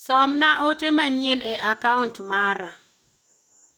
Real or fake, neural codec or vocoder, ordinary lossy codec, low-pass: fake; codec, 44.1 kHz, 2.6 kbps, DAC; none; none